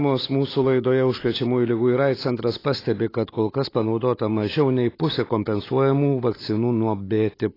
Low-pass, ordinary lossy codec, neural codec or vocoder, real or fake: 5.4 kHz; AAC, 24 kbps; none; real